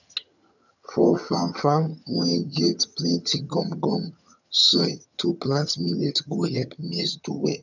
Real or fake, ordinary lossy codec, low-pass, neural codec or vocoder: fake; none; 7.2 kHz; vocoder, 22.05 kHz, 80 mel bands, HiFi-GAN